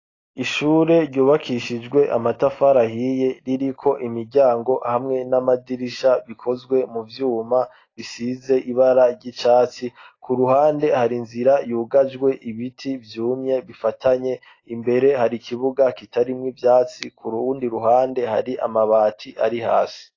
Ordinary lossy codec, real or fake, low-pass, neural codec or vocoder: AAC, 32 kbps; real; 7.2 kHz; none